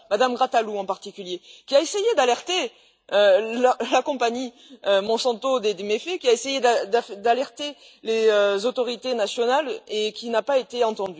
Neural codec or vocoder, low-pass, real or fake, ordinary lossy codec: none; none; real; none